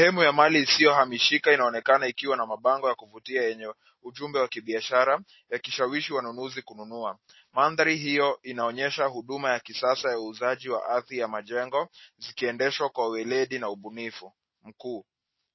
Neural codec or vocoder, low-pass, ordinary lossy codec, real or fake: none; 7.2 kHz; MP3, 24 kbps; real